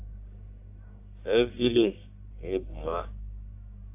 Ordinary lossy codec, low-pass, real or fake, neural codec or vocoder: AAC, 32 kbps; 3.6 kHz; fake; codec, 44.1 kHz, 1.7 kbps, Pupu-Codec